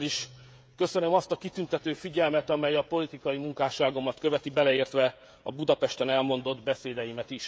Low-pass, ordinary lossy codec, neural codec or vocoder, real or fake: none; none; codec, 16 kHz, 16 kbps, FreqCodec, smaller model; fake